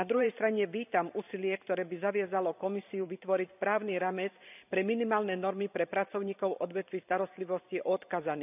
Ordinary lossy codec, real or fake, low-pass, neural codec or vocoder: none; fake; 3.6 kHz; vocoder, 44.1 kHz, 128 mel bands every 512 samples, BigVGAN v2